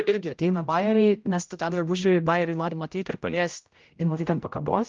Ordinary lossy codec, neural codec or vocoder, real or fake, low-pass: Opus, 32 kbps; codec, 16 kHz, 0.5 kbps, X-Codec, HuBERT features, trained on general audio; fake; 7.2 kHz